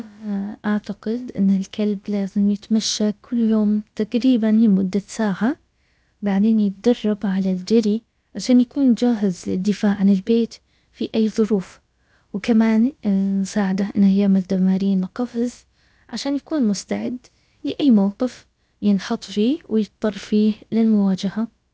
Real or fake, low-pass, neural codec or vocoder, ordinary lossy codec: fake; none; codec, 16 kHz, about 1 kbps, DyCAST, with the encoder's durations; none